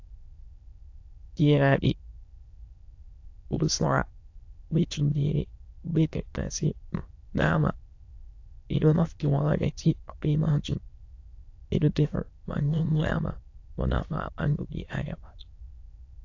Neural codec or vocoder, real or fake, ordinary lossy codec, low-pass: autoencoder, 22.05 kHz, a latent of 192 numbers a frame, VITS, trained on many speakers; fake; AAC, 48 kbps; 7.2 kHz